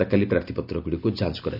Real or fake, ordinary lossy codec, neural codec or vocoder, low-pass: real; none; none; 5.4 kHz